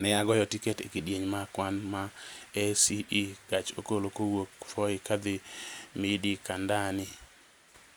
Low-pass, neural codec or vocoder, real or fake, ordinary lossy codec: none; none; real; none